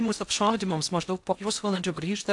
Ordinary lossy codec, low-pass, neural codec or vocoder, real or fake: AAC, 64 kbps; 10.8 kHz; codec, 16 kHz in and 24 kHz out, 0.6 kbps, FocalCodec, streaming, 2048 codes; fake